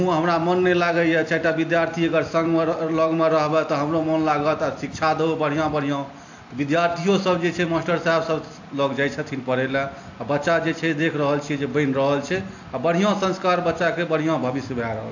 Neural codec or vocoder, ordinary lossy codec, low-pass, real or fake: none; AAC, 48 kbps; 7.2 kHz; real